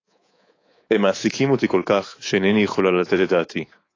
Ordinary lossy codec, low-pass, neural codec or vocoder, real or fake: AAC, 32 kbps; 7.2 kHz; codec, 24 kHz, 3.1 kbps, DualCodec; fake